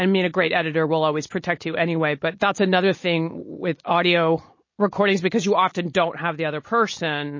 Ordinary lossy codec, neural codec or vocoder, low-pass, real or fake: MP3, 32 kbps; none; 7.2 kHz; real